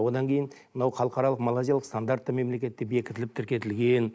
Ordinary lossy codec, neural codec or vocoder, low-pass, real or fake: none; none; none; real